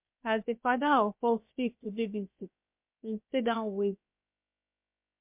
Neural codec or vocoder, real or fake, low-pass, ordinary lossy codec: codec, 16 kHz, about 1 kbps, DyCAST, with the encoder's durations; fake; 3.6 kHz; MP3, 32 kbps